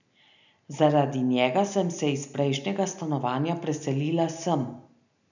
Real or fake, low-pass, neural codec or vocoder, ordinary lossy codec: real; 7.2 kHz; none; none